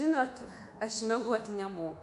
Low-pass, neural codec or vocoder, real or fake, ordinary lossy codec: 10.8 kHz; codec, 24 kHz, 1.2 kbps, DualCodec; fake; MP3, 64 kbps